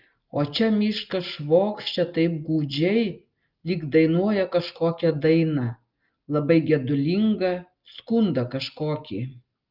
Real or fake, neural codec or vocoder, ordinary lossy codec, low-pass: real; none; Opus, 24 kbps; 5.4 kHz